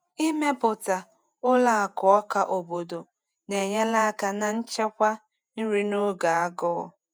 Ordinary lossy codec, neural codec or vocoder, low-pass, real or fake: none; vocoder, 48 kHz, 128 mel bands, Vocos; none; fake